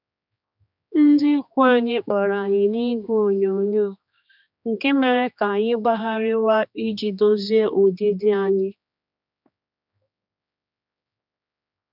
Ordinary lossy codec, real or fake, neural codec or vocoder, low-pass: none; fake; codec, 16 kHz, 2 kbps, X-Codec, HuBERT features, trained on general audio; 5.4 kHz